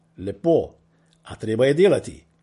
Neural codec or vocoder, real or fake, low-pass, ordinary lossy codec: none; real; 14.4 kHz; MP3, 48 kbps